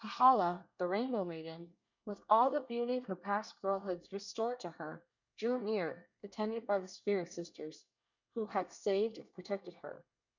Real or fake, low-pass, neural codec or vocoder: fake; 7.2 kHz; codec, 24 kHz, 1 kbps, SNAC